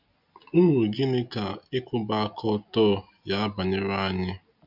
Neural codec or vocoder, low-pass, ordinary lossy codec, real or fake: none; 5.4 kHz; none; real